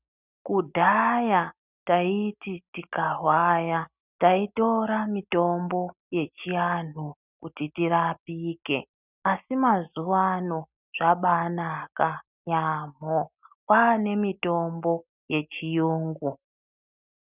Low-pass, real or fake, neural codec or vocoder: 3.6 kHz; real; none